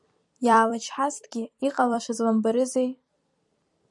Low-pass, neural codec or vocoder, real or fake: 10.8 kHz; vocoder, 44.1 kHz, 128 mel bands every 256 samples, BigVGAN v2; fake